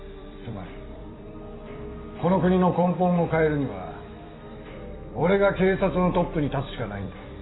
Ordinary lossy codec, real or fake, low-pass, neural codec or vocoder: AAC, 16 kbps; fake; 7.2 kHz; vocoder, 44.1 kHz, 128 mel bands every 256 samples, BigVGAN v2